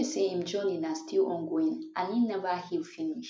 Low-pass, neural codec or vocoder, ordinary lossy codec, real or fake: none; none; none; real